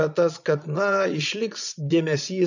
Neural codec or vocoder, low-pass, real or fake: vocoder, 22.05 kHz, 80 mel bands, Vocos; 7.2 kHz; fake